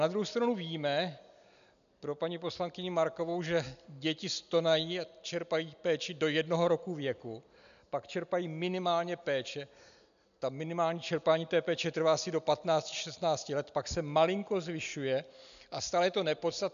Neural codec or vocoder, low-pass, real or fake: none; 7.2 kHz; real